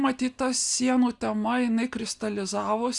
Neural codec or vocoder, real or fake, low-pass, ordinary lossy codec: none; real; 10.8 kHz; Opus, 64 kbps